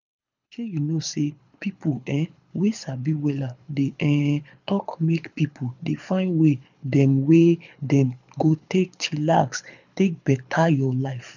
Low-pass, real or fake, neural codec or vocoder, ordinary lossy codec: 7.2 kHz; fake; codec, 24 kHz, 6 kbps, HILCodec; none